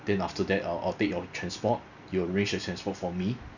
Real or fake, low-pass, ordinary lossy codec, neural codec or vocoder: real; 7.2 kHz; none; none